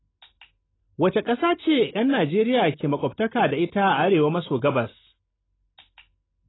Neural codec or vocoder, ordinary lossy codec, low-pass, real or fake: none; AAC, 16 kbps; 7.2 kHz; real